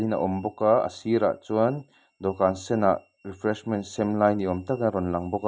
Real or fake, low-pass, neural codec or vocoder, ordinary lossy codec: real; none; none; none